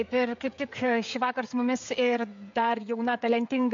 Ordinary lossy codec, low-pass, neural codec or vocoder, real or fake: MP3, 48 kbps; 7.2 kHz; codec, 16 kHz, 16 kbps, FreqCodec, smaller model; fake